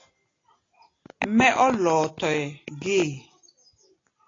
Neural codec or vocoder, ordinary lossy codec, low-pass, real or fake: none; AAC, 32 kbps; 7.2 kHz; real